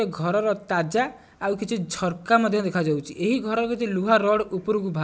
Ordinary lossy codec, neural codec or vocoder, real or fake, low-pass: none; none; real; none